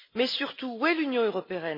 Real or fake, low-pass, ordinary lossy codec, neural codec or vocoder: real; 5.4 kHz; MP3, 24 kbps; none